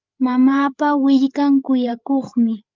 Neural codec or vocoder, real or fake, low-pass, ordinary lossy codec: codec, 16 kHz, 8 kbps, FreqCodec, larger model; fake; 7.2 kHz; Opus, 24 kbps